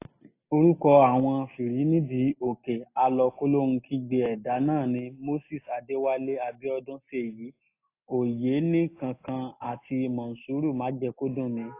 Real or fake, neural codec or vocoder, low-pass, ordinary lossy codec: real; none; 3.6 kHz; AAC, 24 kbps